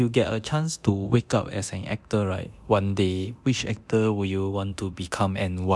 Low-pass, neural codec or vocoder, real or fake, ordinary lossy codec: none; codec, 24 kHz, 0.9 kbps, DualCodec; fake; none